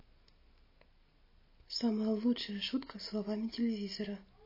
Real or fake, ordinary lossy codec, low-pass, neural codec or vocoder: real; MP3, 24 kbps; 5.4 kHz; none